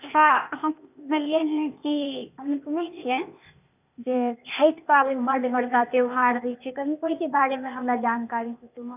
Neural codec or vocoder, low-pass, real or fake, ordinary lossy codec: codec, 16 kHz, 0.8 kbps, ZipCodec; 3.6 kHz; fake; none